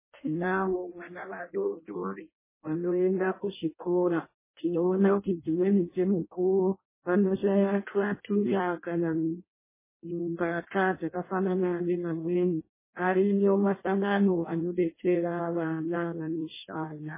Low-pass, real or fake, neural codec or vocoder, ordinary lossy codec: 3.6 kHz; fake; codec, 16 kHz in and 24 kHz out, 0.6 kbps, FireRedTTS-2 codec; MP3, 16 kbps